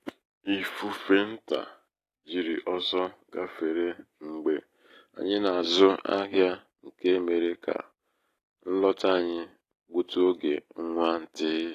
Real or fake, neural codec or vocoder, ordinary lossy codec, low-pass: real; none; AAC, 48 kbps; 14.4 kHz